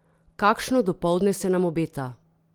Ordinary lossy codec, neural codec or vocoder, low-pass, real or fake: Opus, 24 kbps; none; 19.8 kHz; real